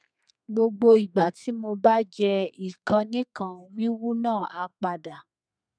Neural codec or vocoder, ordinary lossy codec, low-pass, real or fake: codec, 32 kHz, 1.9 kbps, SNAC; none; 9.9 kHz; fake